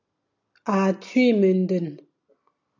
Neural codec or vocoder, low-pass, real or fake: none; 7.2 kHz; real